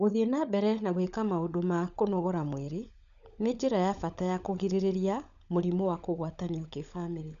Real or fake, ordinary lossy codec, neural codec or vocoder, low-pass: fake; none; codec, 16 kHz, 16 kbps, FunCodec, trained on LibriTTS, 50 frames a second; 7.2 kHz